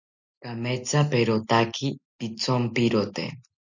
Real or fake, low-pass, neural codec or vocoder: real; 7.2 kHz; none